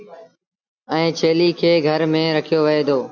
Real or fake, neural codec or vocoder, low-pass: real; none; 7.2 kHz